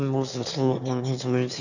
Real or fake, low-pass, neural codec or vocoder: fake; 7.2 kHz; autoencoder, 22.05 kHz, a latent of 192 numbers a frame, VITS, trained on one speaker